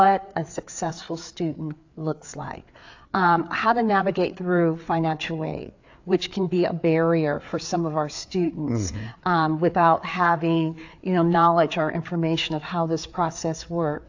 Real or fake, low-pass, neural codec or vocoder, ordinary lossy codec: fake; 7.2 kHz; codec, 16 kHz, 4 kbps, FreqCodec, larger model; AAC, 48 kbps